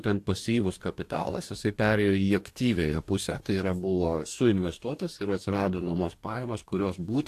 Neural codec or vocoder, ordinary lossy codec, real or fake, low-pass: codec, 44.1 kHz, 2.6 kbps, DAC; AAC, 64 kbps; fake; 14.4 kHz